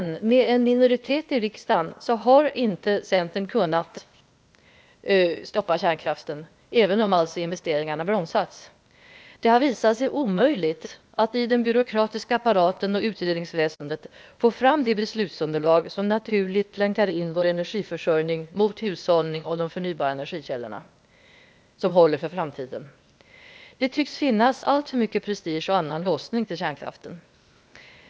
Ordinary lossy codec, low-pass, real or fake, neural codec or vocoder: none; none; fake; codec, 16 kHz, 0.8 kbps, ZipCodec